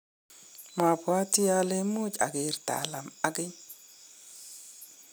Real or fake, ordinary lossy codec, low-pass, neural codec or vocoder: real; none; none; none